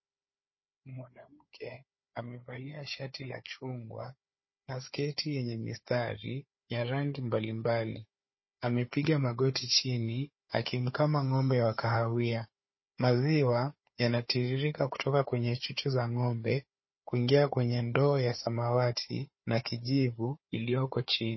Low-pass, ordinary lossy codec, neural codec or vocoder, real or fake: 7.2 kHz; MP3, 24 kbps; codec, 16 kHz, 4 kbps, FunCodec, trained on Chinese and English, 50 frames a second; fake